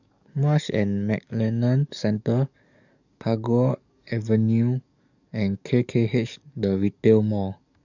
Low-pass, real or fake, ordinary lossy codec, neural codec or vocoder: 7.2 kHz; fake; none; codec, 44.1 kHz, 7.8 kbps, DAC